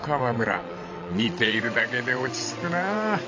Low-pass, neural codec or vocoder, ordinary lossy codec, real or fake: 7.2 kHz; vocoder, 22.05 kHz, 80 mel bands, WaveNeXt; AAC, 32 kbps; fake